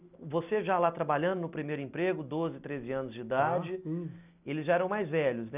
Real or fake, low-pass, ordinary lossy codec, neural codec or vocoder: real; 3.6 kHz; none; none